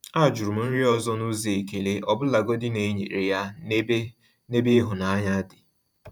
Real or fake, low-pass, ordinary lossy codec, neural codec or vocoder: fake; 19.8 kHz; none; vocoder, 44.1 kHz, 128 mel bands every 512 samples, BigVGAN v2